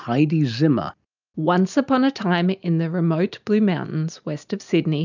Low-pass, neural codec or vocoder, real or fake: 7.2 kHz; none; real